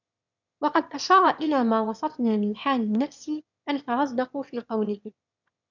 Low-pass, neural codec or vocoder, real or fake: 7.2 kHz; autoencoder, 22.05 kHz, a latent of 192 numbers a frame, VITS, trained on one speaker; fake